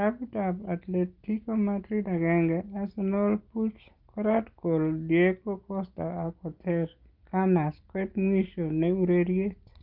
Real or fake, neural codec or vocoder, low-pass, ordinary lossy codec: real; none; 5.4 kHz; Opus, 24 kbps